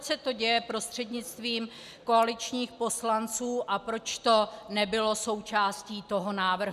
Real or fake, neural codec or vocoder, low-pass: real; none; 14.4 kHz